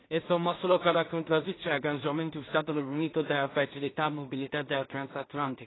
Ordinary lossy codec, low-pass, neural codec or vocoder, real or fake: AAC, 16 kbps; 7.2 kHz; codec, 16 kHz in and 24 kHz out, 0.4 kbps, LongCat-Audio-Codec, two codebook decoder; fake